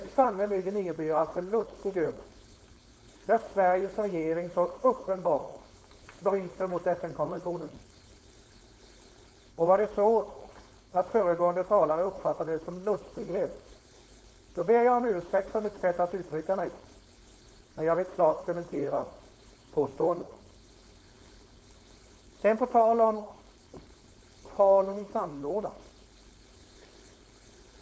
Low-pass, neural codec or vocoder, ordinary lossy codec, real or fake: none; codec, 16 kHz, 4.8 kbps, FACodec; none; fake